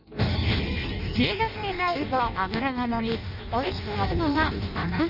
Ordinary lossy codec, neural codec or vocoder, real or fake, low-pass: none; codec, 16 kHz in and 24 kHz out, 0.6 kbps, FireRedTTS-2 codec; fake; 5.4 kHz